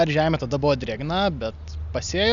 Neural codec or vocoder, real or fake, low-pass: none; real; 7.2 kHz